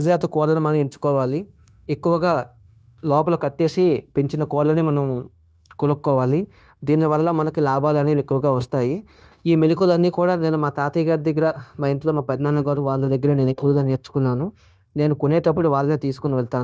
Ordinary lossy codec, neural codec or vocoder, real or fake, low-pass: none; codec, 16 kHz, 0.9 kbps, LongCat-Audio-Codec; fake; none